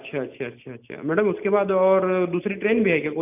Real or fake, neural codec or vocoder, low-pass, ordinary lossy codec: real; none; 3.6 kHz; none